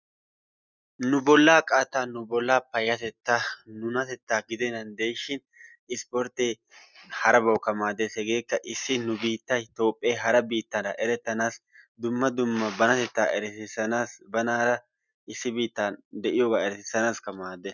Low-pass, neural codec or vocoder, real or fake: 7.2 kHz; none; real